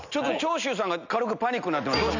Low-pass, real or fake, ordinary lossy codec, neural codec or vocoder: 7.2 kHz; real; none; none